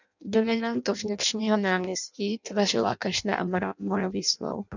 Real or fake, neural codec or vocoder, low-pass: fake; codec, 16 kHz in and 24 kHz out, 0.6 kbps, FireRedTTS-2 codec; 7.2 kHz